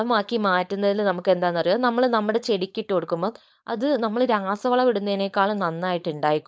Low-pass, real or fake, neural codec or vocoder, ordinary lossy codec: none; fake; codec, 16 kHz, 4.8 kbps, FACodec; none